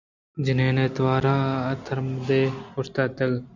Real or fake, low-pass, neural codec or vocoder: real; 7.2 kHz; none